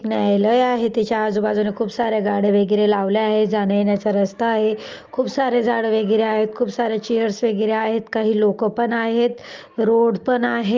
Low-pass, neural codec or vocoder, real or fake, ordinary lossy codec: 7.2 kHz; none; real; Opus, 24 kbps